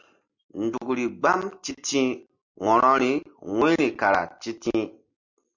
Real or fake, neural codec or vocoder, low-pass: real; none; 7.2 kHz